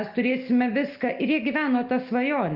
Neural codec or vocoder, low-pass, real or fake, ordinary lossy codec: none; 5.4 kHz; real; Opus, 24 kbps